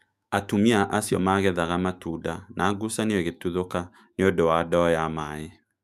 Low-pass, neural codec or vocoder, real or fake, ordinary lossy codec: 14.4 kHz; autoencoder, 48 kHz, 128 numbers a frame, DAC-VAE, trained on Japanese speech; fake; none